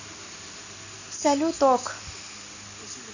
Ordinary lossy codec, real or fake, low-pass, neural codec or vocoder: none; real; 7.2 kHz; none